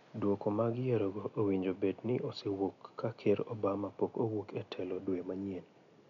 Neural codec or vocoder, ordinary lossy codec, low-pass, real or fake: none; none; 7.2 kHz; real